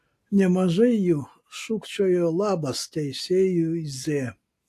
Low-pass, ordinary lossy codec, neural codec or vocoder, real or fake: 14.4 kHz; AAC, 64 kbps; none; real